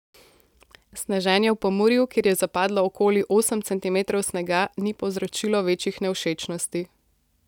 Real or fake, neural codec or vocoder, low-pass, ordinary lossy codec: real; none; 19.8 kHz; none